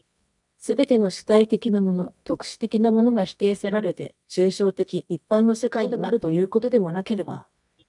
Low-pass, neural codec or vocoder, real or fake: 10.8 kHz; codec, 24 kHz, 0.9 kbps, WavTokenizer, medium music audio release; fake